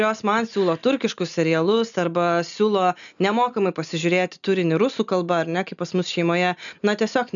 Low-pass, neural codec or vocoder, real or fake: 7.2 kHz; none; real